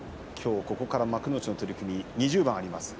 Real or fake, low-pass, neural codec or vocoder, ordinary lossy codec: real; none; none; none